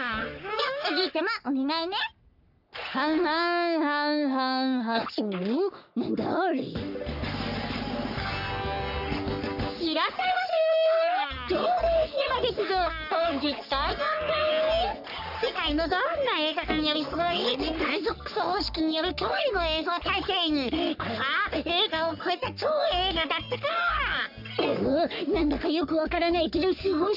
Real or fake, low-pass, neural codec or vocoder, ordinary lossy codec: fake; 5.4 kHz; codec, 44.1 kHz, 3.4 kbps, Pupu-Codec; none